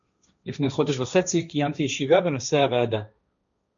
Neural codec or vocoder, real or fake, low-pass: codec, 16 kHz, 1.1 kbps, Voila-Tokenizer; fake; 7.2 kHz